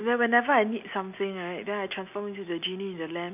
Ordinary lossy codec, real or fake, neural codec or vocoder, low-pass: none; real; none; 3.6 kHz